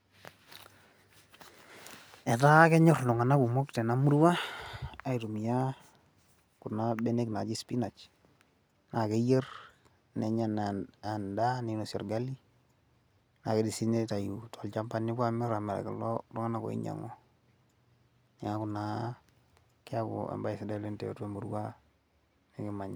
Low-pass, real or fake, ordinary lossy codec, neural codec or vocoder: none; real; none; none